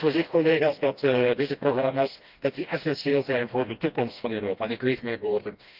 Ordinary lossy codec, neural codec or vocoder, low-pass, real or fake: Opus, 32 kbps; codec, 16 kHz, 1 kbps, FreqCodec, smaller model; 5.4 kHz; fake